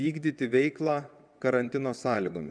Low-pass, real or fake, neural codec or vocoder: 9.9 kHz; fake; vocoder, 22.05 kHz, 80 mel bands, WaveNeXt